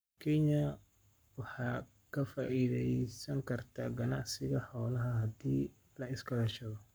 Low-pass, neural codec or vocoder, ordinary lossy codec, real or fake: none; codec, 44.1 kHz, 7.8 kbps, Pupu-Codec; none; fake